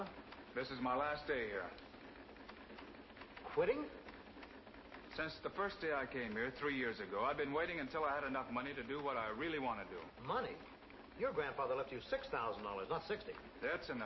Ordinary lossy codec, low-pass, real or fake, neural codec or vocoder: MP3, 24 kbps; 7.2 kHz; real; none